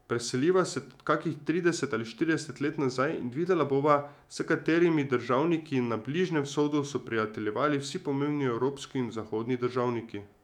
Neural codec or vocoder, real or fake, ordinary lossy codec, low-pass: autoencoder, 48 kHz, 128 numbers a frame, DAC-VAE, trained on Japanese speech; fake; none; 19.8 kHz